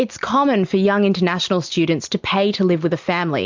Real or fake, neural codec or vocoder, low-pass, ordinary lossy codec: real; none; 7.2 kHz; MP3, 64 kbps